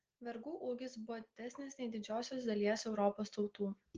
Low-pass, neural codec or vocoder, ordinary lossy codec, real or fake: 7.2 kHz; none; Opus, 16 kbps; real